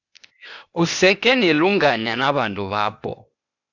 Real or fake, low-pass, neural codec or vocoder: fake; 7.2 kHz; codec, 16 kHz, 0.8 kbps, ZipCodec